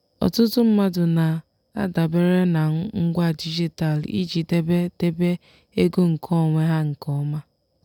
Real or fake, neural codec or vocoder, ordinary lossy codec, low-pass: real; none; none; 19.8 kHz